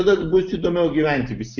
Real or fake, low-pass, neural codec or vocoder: real; 7.2 kHz; none